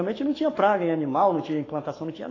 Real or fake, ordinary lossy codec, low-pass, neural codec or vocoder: fake; AAC, 32 kbps; 7.2 kHz; codec, 44.1 kHz, 7.8 kbps, Pupu-Codec